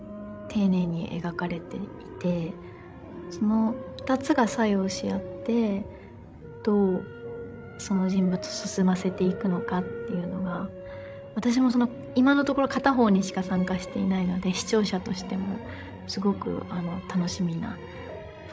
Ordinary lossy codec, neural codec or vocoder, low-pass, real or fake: none; codec, 16 kHz, 16 kbps, FreqCodec, larger model; none; fake